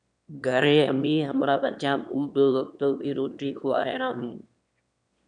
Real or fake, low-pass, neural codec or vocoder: fake; 9.9 kHz; autoencoder, 22.05 kHz, a latent of 192 numbers a frame, VITS, trained on one speaker